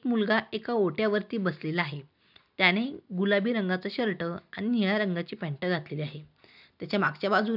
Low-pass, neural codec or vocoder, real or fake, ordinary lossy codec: 5.4 kHz; none; real; none